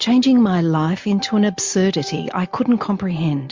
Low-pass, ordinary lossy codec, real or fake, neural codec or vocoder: 7.2 kHz; MP3, 48 kbps; real; none